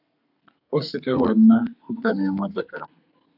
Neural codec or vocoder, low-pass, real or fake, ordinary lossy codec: codec, 32 kHz, 1.9 kbps, SNAC; 5.4 kHz; fake; AAC, 48 kbps